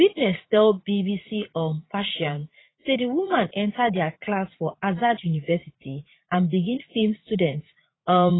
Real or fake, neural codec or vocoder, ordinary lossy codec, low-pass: fake; vocoder, 44.1 kHz, 80 mel bands, Vocos; AAC, 16 kbps; 7.2 kHz